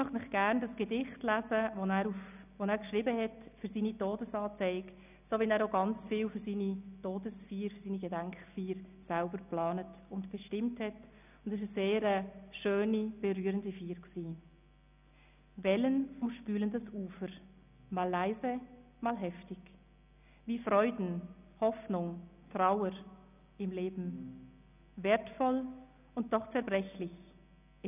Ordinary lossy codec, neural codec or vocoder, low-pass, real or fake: none; none; 3.6 kHz; real